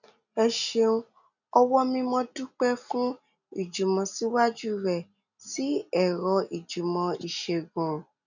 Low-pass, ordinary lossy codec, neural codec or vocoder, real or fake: 7.2 kHz; none; none; real